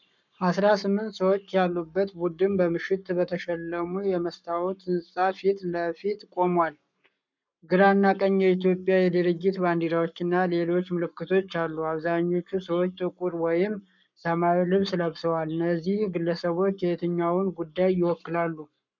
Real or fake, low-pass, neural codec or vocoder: fake; 7.2 kHz; codec, 44.1 kHz, 7.8 kbps, Pupu-Codec